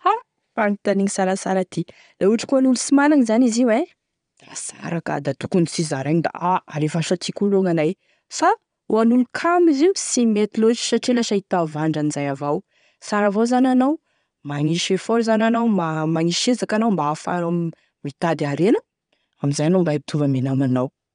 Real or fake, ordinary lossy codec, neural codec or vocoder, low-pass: fake; none; vocoder, 22.05 kHz, 80 mel bands, Vocos; 9.9 kHz